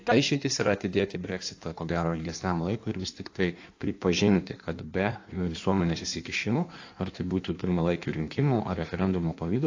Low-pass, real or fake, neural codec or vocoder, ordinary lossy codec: 7.2 kHz; fake; codec, 16 kHz in and 24 kHz out, 1.1 kbps, FireRedTTS-2 codec; AAC, 48 kbps